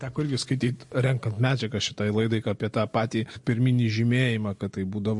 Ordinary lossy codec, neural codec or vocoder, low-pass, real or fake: MP3, 48 kbps; none; 10.8 kHz; real